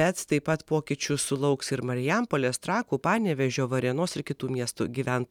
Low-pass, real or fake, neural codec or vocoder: 19.8 kHz; real; none